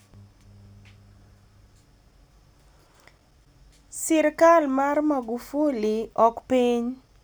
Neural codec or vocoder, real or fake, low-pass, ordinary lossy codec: none; real; none; none